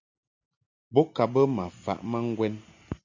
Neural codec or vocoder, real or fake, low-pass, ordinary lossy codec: none; real; 7.2 kHz; MP3, 64 kbps